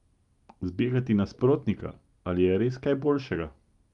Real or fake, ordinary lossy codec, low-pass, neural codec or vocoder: real; Opus, 32 kbps; 10.8 kHz; none